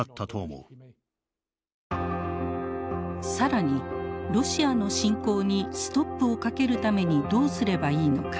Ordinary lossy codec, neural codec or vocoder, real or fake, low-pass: none; none; real; none